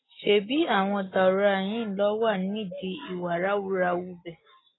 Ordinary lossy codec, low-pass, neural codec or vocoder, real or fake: AAC, 16 kbps; 7.2 kHz; none; real